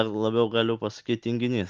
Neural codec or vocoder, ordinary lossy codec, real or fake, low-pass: none; Opus, 32 kbps; real; 7.2 kHz